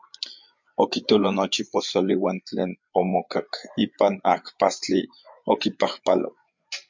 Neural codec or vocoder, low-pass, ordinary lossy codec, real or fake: codec, 16 kHz, 8 kbps, FreqCodec, larger model; 7.2 kHz; MP3, 64 kbps; fake